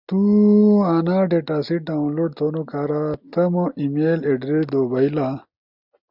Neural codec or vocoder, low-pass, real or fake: none; 5.4 kHz; real